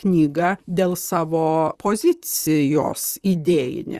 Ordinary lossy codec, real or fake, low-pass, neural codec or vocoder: Opus, 64 kbps; fake; 14.4 kHz; vocoder, 44.1 kHz, 128 mel bands, Pupu-Vocoder